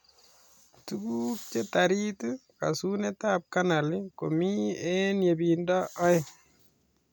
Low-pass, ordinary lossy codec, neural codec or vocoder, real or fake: none; none; none; real